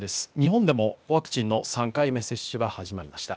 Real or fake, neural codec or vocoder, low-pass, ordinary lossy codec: fake; codec, 16 kHz, 0.8 kbps, ZipCodec; none; none